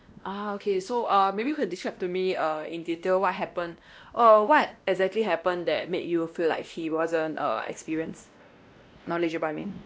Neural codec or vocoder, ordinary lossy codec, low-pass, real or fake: codec, 16 kHz, 1 kbps, X-Codec, WavLM features, trained on Multilingual LibriSpeech; none; none; fake